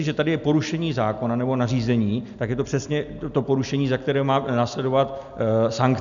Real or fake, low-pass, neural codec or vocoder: real; 7.2 kHz; none